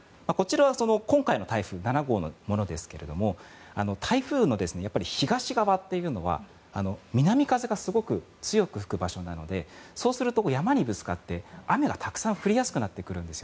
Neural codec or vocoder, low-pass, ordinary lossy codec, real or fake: none; none; none; real